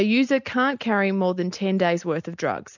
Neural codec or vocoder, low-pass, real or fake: none; 7.2 kHz; real